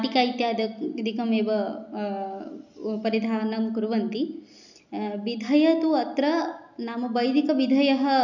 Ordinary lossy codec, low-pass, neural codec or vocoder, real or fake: none; 7.2 kHz; none; real